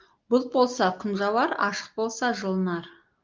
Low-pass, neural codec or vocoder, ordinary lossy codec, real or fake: 7.2 kHz; none; Opus, 32 kbps; real